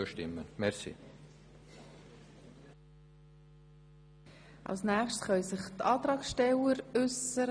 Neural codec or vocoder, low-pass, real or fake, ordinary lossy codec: none; 9.9 kHz; real; none